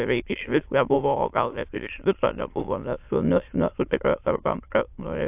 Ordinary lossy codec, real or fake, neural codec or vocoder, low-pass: AAC, 32 kbps; fake; autoencoder, 22.05 kHz, a latent of 192 numbers a frame, VITS, trained on many speakers; 3.6 kHz